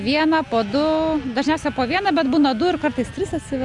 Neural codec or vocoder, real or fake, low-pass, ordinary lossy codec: none; real; 10.8 kHz; Opus, 64 kbps